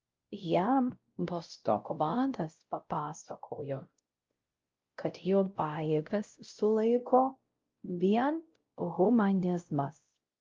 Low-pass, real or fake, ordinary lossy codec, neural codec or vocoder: 7.2 kHz; fake; Opus, 24 kbps; codec, 16 kHz, 0.5 kbps, X-Codec, WavLM features, trained on Multilingual LibriSpeech